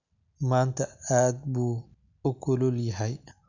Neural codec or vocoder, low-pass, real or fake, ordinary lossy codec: none; 7.2 kHz; real; none